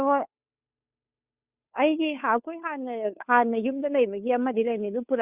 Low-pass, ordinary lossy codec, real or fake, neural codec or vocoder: 3.6 kHz; Opus, 64 kbps; fake; codec, 16 kHz, 2 kbps, FunCodec, trained on Chinese and English, 25 frames a second